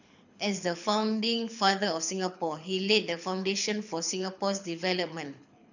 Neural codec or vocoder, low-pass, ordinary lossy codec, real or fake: codec, 24 kHz, 6 kbps, HILCodec; 7.2 kHz; none; fake